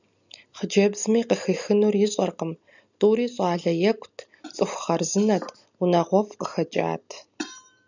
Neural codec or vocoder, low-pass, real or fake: none; 7.2 kHz; real